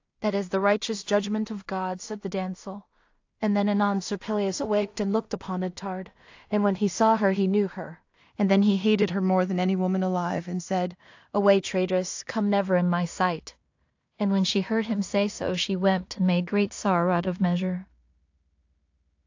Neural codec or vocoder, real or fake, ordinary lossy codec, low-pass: codec, 16 kHz in and 24 kHz out, 0.4 kbps, LongCat-Audio-Codec, two codebook decoder; fake; AAC, 48 kbps; 7.2 kHz